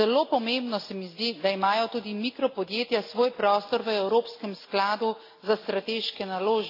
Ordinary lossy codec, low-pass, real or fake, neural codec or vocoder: AAC, 32 kbps; 5.4 kHz; real; none